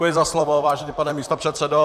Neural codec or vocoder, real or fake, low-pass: vocoder, 44.1 kHz, 128 mel bands, Pupu-Vocoder; fake; 14.4 kHz